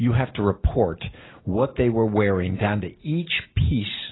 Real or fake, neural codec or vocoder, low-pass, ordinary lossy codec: real; none; 7.2 kHz; AAC, 16 kbps